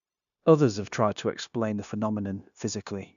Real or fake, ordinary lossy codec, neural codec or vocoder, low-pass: fake; none; codec, 16 kHz, 0.9 kbps, LongCat-Audio-Codec; 7.2 kHz